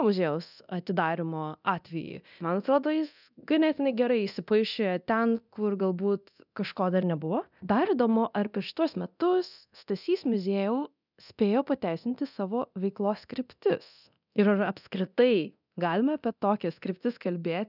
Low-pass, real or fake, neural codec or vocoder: 5.4 kHz; fake; codec, 24 kHz, 0.9 kbps, DualCodec